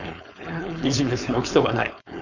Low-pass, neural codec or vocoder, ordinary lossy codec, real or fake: 7.2 kHz; codec, 16 kHz, 4.8 kbps, FACodec; none; fake